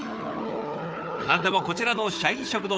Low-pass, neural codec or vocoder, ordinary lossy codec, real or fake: none; codec, 16 kHz, 4 kbps, FunCodec, trained on Chinese and English, 50 frames a second; none; fake